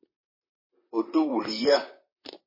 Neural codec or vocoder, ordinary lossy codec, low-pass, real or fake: vocoder, 44.1 kHz, 128 mel bands, Pupu-Vocoder; MP3, 24 kbps; 5.4 kHz; fake